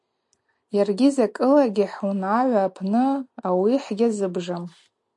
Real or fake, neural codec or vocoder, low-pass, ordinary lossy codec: real; none; 10.8 kHz; MP3, 64 kbps